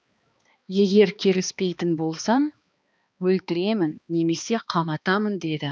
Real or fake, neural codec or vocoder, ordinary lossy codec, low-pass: fake; codec, 16 kHz, 2 kbps, X-Codec, HuBERT features, trained on balanced general audio; none; none